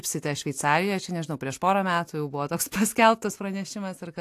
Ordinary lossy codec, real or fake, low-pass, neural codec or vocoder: AAC, 64 kbps; real; 14.4 kHz; none